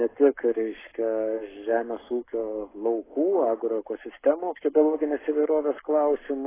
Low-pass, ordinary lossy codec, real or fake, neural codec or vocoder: 3.6 kHz; AAC, 16 kbps; fake; codec, 44.1 kHz, 7.8 kbps, Pupu-Codec